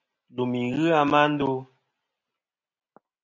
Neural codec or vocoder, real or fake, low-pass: none; real; 7.2 kHz